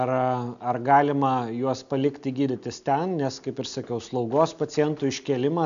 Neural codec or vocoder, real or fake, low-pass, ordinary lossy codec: none; real; 7.2 kHz; MP3, 96 kbps